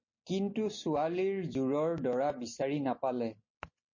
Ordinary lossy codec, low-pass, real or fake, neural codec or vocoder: MP3, 32 kbps; 7.2 kHz; real; none